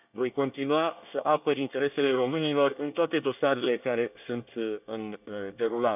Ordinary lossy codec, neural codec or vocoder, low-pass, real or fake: none; codec, 24 kHz, 1 kbps, SNAC; 3.6 kHz; fake